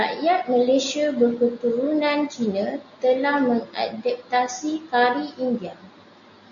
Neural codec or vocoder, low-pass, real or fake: none; 7.2 kHz; real